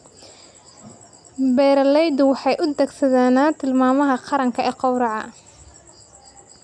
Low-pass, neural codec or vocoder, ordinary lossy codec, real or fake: 9.9 kHz; none; none; real